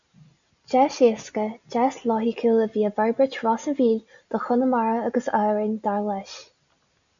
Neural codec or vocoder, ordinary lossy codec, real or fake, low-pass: none; MP3, 96 kbps; real; 7.2 kHz